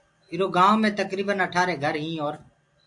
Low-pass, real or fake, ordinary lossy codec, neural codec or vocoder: 10.8 kHz; real; AAC, 64 kbps; none